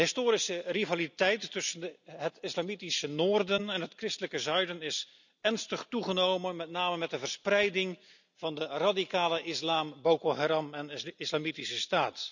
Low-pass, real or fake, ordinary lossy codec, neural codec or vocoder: 7.2 kHz; real; none; none